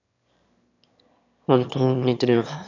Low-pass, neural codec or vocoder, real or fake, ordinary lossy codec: 7.2 kHz; autoencoder, 22.05 kHz, a latent of 192 numbers a frame, VITS, trained on one speaker; fake; none